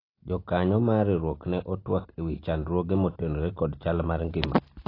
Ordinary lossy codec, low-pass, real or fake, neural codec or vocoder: AAC, 24 kbps; 5.4 kHz; real; none